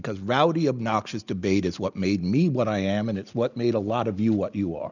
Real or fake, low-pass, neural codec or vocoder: real; 7.2 kHz; none